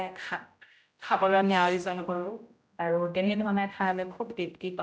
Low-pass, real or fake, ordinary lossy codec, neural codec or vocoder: none; fake; none; codec, 16 kHz, 0.5 kbps, X-Codec, HuBERT features, trained on general audio